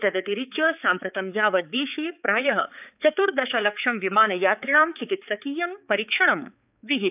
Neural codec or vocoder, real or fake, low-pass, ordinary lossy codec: codec, 16 kHz, 4 kbps, X-Codec, HuBERT features, trained on balanced general audio; fake; 3.6 kHz; none